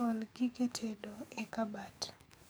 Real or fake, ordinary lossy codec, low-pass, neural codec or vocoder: fake; none; none; codec, 44.1 kHz, 7.8 kbps, DAC